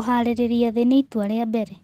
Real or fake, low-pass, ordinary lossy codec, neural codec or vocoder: real; 14.4 kHz; Opus, 16 kbps; none